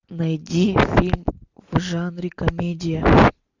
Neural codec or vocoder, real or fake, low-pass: none; real; 7.2 kHz